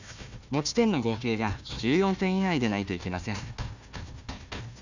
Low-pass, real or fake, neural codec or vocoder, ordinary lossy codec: 7.2 kHz; fake; codec, 16 kHz, 1 kbps, FunCodec, trained on Chinese and English, 50 frames a second; none